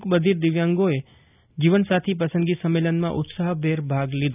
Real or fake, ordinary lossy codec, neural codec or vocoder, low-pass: real; none; none; 3.6 kHz